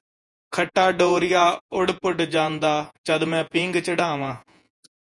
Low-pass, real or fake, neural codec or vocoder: 10.8 kHz; fake; vocoder, 48 kHz, 128 mel bands, Vocos